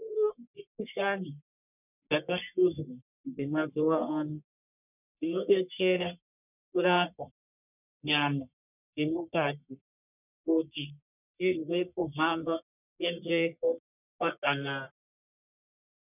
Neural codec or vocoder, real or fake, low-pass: codec, 44.1 kHz, 1.7 kbps, Pupu-Codec; fake; 3.6 kHz